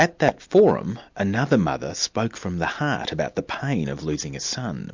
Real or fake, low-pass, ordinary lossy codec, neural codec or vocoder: real; 7.2 kHz; MP3, 64 kbps; none